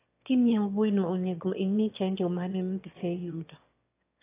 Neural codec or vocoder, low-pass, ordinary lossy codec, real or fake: autoencoder, 22.05 kHz, a latent of 192 numbers a frame, VITS, trained on one speaker; 3.6 kHz; AAC, 24 kbps; fake